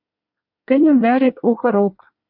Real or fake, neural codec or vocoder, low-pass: fake; codec, 24 kHz, 1 kbps, SNAC; 5.4 kHz